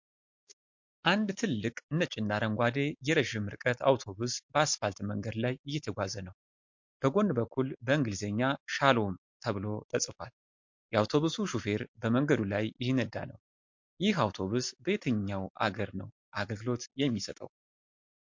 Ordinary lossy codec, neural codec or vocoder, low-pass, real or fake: MP3, 48 kbps; none; 7.2 kHz; real